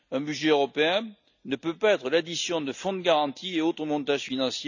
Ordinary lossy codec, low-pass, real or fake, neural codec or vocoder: none; 7.2 kHz; real; none